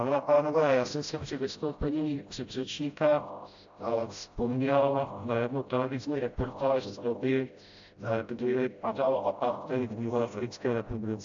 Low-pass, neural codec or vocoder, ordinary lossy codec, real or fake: 7.2 kHz; codec, 16 kHz, 0.5 kbps, FreqCodec, smaller model; AAC, 64 kbps; fake